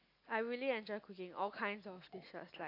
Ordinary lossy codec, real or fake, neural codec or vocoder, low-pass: AAC, 32 kbps; real; none; 5.4 kHz